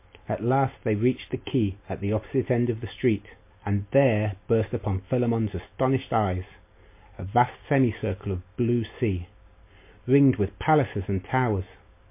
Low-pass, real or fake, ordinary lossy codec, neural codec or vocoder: 3.6 kHz; real; MP3, 24 kbps; none